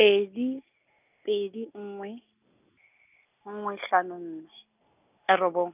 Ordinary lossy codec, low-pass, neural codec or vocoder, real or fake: none; 3.6 kHz; none; real